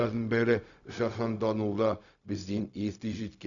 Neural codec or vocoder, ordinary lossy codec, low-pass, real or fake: codec, 16 kHz, 0.4 kbps, LongCat-Audio-Codec; none; 7.2 kHz; fake